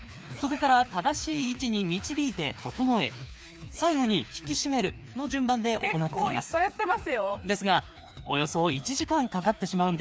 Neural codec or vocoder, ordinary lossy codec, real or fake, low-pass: codec, 16 kHz, 2 kbps, FreqCodec, larger model; none; fake; none